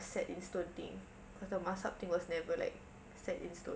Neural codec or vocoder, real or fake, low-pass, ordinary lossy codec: none; real; none; none